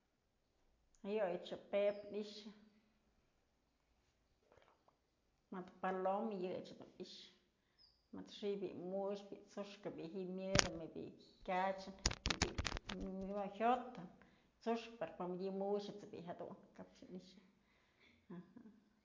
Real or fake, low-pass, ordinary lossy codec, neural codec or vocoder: real; 7.2 kHz; MP3, 48 kbps; none